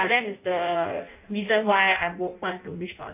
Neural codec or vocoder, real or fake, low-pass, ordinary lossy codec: codec, 16 kHz in and 24 kHz out, 0.6 kbps, FireRedTTS-2 codec; fake; 3.6 kHz; AAC, 32 kbps